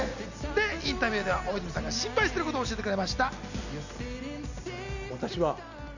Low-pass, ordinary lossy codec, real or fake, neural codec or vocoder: 7.2 kHz; none; real; none